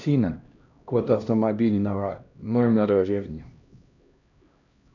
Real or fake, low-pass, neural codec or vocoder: fake; 7.2 kHz; codec, 16 kHz, 1 kbps, X-Codec, HuBERT features, trained on LibriSpeech